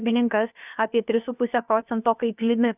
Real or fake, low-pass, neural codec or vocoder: fake; 3.6 kHz; codec, 16 kHz, about 1 kbps, DyCAST, with the encoder's durations